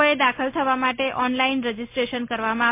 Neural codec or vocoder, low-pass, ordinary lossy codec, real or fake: none; 3.6 kHz; MP3, 24 kbps; real